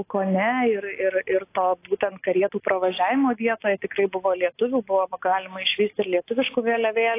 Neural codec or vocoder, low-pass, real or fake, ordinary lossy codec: none; 3.6 kHz; real; AAC, 24 kbps